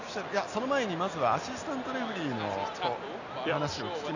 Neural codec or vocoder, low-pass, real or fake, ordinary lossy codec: none; 7.2 kHz; real; none